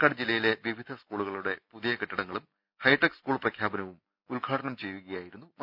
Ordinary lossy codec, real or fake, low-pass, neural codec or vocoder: none; real; 5.4 kHz; none